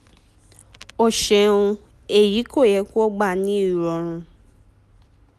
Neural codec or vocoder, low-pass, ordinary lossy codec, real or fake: none; 14.4 kHz; none; real